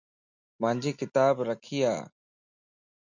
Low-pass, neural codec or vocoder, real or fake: 7.2 kHz; none; real